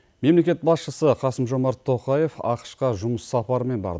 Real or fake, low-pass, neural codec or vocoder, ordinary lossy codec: real; none; none; none